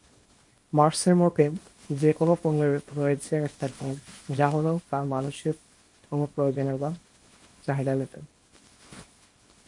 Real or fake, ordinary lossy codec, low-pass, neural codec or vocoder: fake; MP3, 48 kbps; 10.8 kHz; codec, 24 kHz, 0.9 kbps, WavTokenizer, small release